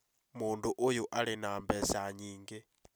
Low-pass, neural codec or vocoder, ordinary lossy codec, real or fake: none; none; none; real